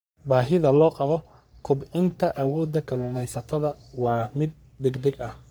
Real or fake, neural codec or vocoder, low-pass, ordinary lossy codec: fake; codec, 44.1 kHz, 3.4 kbps, Pupu-Codec; none; none